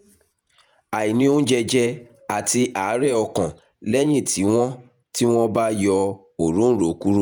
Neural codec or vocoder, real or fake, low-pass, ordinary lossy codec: vocoder, 48 kHz, 128 mel bands, Vocos; fake; none; none